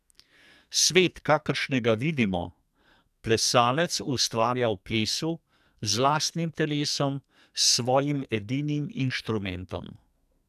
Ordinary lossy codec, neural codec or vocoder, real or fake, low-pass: none; codec, 44.1 kHz, 2.6 kbps, SNAC; fake; 14.4 kHz